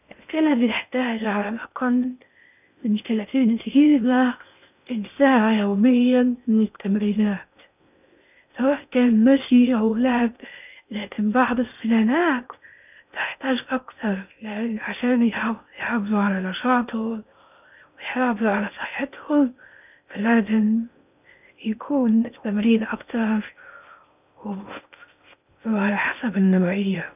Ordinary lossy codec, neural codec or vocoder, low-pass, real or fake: none; codec, 16 kHz in and 24 kHz out, 0.6 kbps, FocalCodec, streaming, 2048 codes; 3.6 kHz; fake